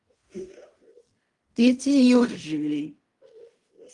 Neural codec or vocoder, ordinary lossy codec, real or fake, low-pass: codec, 16 kHz in and 24 kHz out, 0.4 kbps, LongCat-Audio-Codec, fine tuned four codebook decoder; Opus, 32 kbps; fake; 10.8 kHz